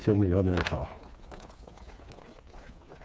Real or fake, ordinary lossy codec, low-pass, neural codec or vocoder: fake; none; none; codec, 16 kHz, 4 kbps, FreqCodec, smaller model